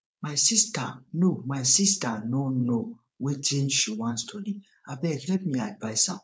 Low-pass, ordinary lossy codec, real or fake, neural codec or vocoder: none; none; fake; codec, 16 kHz, 4.8 kbps, FACodec